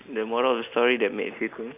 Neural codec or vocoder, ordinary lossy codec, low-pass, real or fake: none; none; 3.6 kHz; real